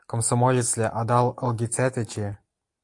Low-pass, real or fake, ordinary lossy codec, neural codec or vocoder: 10.8 kHz; real; AAC, 64 kbps; none